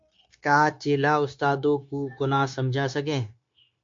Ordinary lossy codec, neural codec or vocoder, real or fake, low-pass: MP3, 48 kbps; codec, 16 kHz, 0.9 kbps, LongCat-Audio-Codec; fake; 7.2 kHz